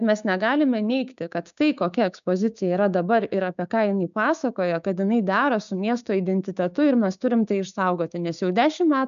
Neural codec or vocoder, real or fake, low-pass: codec, 16 kHz, 6 kbps, DAC; fake; 7.2 kHz